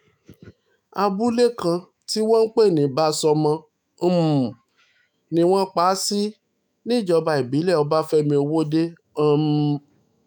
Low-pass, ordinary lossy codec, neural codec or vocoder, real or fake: none; none; autoencoder, 48 kHz, 128 numbers a frame, DAC-VAE, trained on Japanese speech; fake